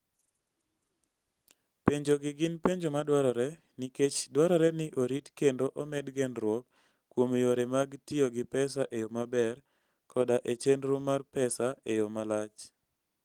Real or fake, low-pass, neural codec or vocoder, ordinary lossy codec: real; 19.8 kHz; none; Opus, 24 kbps